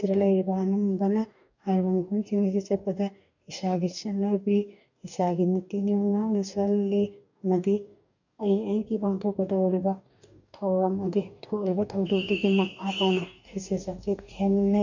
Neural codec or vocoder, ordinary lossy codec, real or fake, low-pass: codec, 44.1 kHz, 2.6 kbps, DAC; none; fake; 7.2 kHz